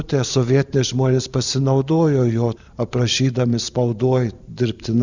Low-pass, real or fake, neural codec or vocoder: 7.2 kHz; fake; vocoder, 44.1 kHz, 128 mel bands every 512 samples, BigVGAN v2